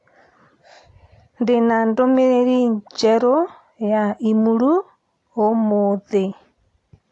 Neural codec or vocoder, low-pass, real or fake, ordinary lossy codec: none; 10.8 kHz; real; AAC, 48 kbps